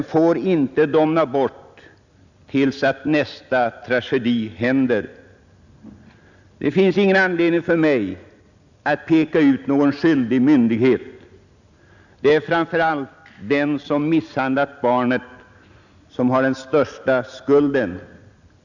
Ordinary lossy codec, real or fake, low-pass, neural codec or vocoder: none; real; 7.2 kHz; none